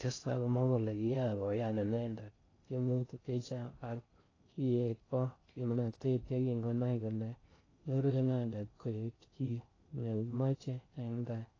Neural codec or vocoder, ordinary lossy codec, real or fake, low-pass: codec, 16 kHz in and 24 kHz out, 0.6 kbps, FocalCodec, streaming, 4096 codes; AAC, 32 kbps; fake; 7.2 kHz